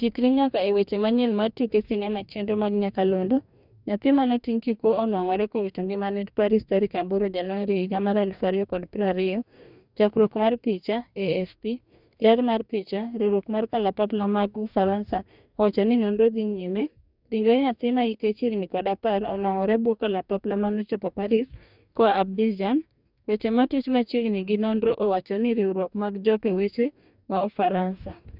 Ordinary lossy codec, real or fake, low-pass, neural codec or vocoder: none; fake; 5.4 kHz; codec, 44.1 kHz, 2.6 kbps, DAC